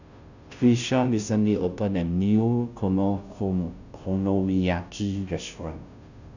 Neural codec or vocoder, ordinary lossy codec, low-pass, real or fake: codec, 16 kHz, 0.5 kbps, FunCodec, trained on Chinese and English, 25 frames a second; none; 7.2 kHz; fake